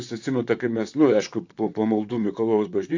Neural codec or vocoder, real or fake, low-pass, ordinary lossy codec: vocoder, 44.1 kHz, 128 mel bands, Pupu-Vocoder; fake; 7.2 kHz; AAC, 48 kbps